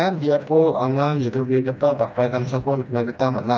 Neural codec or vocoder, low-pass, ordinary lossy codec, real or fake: codec, 16 kHz, 1 kbps, FreqCodec, smaller model; none; none; fake